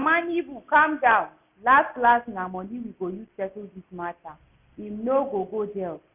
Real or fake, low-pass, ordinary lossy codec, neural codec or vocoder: real; 3.6 kHz; none; none